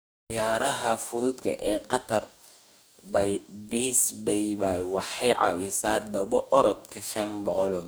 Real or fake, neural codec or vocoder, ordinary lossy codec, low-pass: fake; codec, 44.1 kHz, 2.6 kbps, DAC; none; none